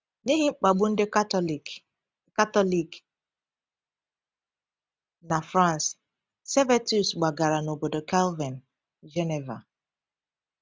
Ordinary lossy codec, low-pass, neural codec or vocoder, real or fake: none; none; none; real